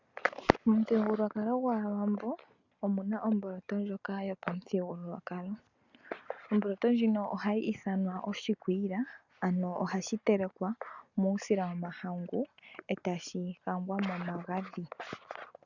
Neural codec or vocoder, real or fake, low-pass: none; real; 7.2 kHz